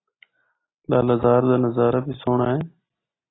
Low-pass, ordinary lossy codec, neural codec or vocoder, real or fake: 7.2 kHz; AAC, 16 kbps; none; real